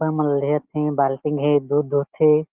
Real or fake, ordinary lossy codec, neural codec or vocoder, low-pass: real; Opus, 24 kbps; none; 3.6 kHz